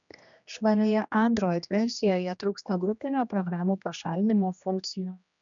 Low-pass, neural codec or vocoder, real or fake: 7.2 kHz; codec, 16 kHz, 1 kbps, X-Codec, HuBERT features, trained on general audio; fake